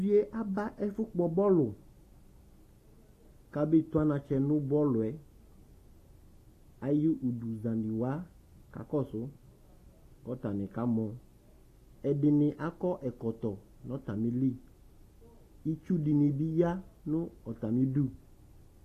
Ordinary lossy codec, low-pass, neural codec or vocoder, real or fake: AAC, 48 kbps; 14.4 kHz; none; real